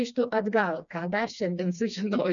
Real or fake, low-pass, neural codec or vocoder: fake; 7.2 kHz; codec, 16 kHz, 2 kbps, FreqCodec, smaller model